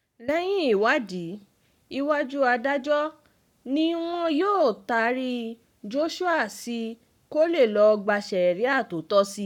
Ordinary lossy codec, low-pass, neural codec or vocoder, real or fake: none; 19.8 kHz; codec, 44.1 kHz, 7.8 kbps, Pupu-Codec; fake